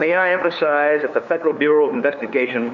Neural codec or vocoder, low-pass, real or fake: codec, 16 kHz, 2 kbps, FunCodec, trained on LibriTTS, 25 frames a second; 7.2 kHz; fake